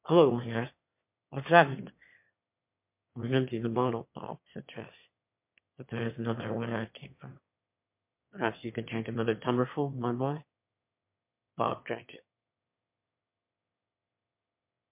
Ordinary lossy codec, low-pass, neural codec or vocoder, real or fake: MP3, 32 kbps; 3.6 kHz; autoencoder, 22.05 kHz, a latent of 192 numbers a frame, VITS, trained on one speaker; fake